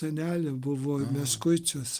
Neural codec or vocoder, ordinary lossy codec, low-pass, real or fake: none; Opus, 32 kbps; 14.4 kHz; real